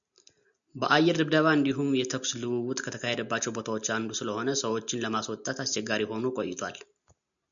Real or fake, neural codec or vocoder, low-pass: real; none; 7.2 kHz